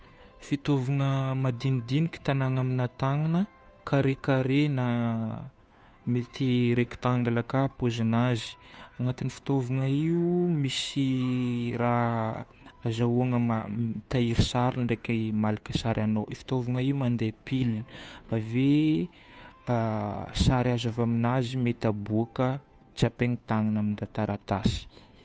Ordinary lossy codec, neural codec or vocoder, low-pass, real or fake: none; codec, 16 kHz, 2 kbps, FunCodec, trained on Chinese and English, 25 frames a second; none; fake